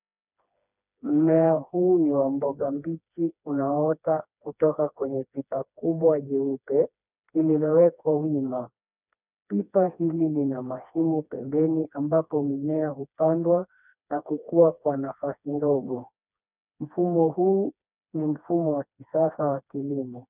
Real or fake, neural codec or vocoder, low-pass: fake; codec, 16 kHz, 2 kbps, FreqCodec, smaller model; 3.6 kHz